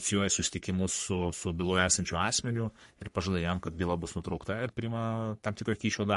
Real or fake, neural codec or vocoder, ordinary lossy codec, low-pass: fake; codec, 44.1 kHz, 3.4 kbps, Pupu-Codec; MP3, 48 kbps; 14.4 kHz